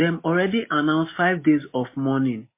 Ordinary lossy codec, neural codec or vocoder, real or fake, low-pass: MP3, 24 kbps; none; real; 3.6 kHz